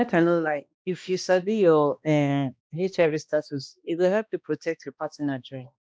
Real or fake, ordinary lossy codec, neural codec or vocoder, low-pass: fake; none; codec, 16 kHz, 1 kbps, X-Codec, HuBERT features, trained on balanced general audio; none